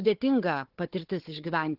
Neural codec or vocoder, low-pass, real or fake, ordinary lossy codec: codec, 16 kHz, 4 kbps, FunCodec, trained on Chinese and English, 50 frames a second; 5.4 kHz; fake; Opus, 16 kbps